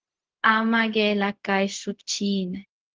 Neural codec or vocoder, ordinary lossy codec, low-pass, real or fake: codec, 16 kHz, 0.4 kbps, LongCat-Audio-Codec; Opus, 16 kbps; 7.2 kHz; fake